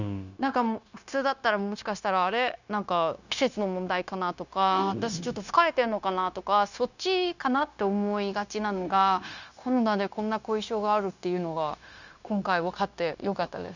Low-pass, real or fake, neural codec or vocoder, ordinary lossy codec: 7.2 kHz; fake; codec, 16 kHz, 0.9 kbps, LongCat-Audio-Codec; none